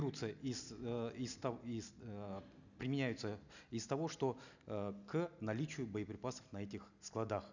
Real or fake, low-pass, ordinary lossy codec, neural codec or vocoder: real; 7.2 kHz; none; none